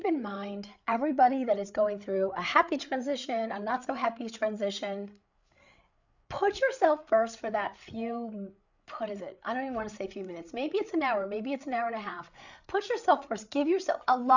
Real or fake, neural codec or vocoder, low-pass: fake; codec, 16 kHz, 8 kbps, FreqCodec, larger model; 7.2 kHz